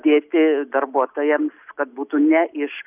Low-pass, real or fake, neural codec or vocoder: 3.6 kHz; real; none